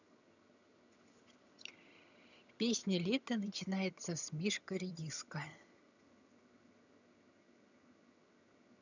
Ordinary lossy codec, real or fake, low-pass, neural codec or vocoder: none; fake; 7.2 kHz; vocoder, 22.05 kHz, 80 mel bands, HiFi-GAN